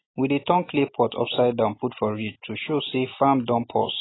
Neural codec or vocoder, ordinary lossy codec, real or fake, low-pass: none; AAC, 16 kbps; real; 7.2 kHz